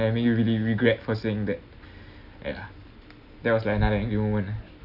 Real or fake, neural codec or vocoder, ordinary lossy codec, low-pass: real; none; none; 5.4 kHz